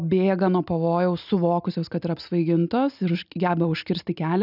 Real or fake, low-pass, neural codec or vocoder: real; 5.4 kHz; none